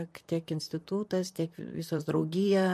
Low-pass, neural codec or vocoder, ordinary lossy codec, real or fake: 14.4 kHz; vocoder, 44.1 kHz, 128 mel bands, Pupu-Vocoder; MP3, 64 kbps; fake